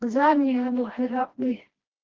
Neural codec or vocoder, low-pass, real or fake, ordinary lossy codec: codec, 16 kHz, 1 kbps, FreqCodec, smaller model; 7.2 kHz; fake; Opus, 16 kbps